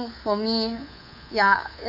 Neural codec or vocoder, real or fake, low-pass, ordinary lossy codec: codec, 24 kHz, 3.1 kbps, DualCodec; fake; 5.4 kHz; none